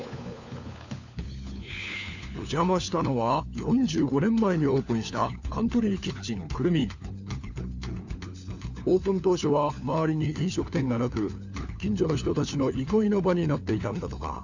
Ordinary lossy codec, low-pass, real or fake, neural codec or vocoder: none; 7.2 kHz; fake; codec, 16 kHz, 4 kbps, FunCodec, trained on LibriTTS, 50 frames a second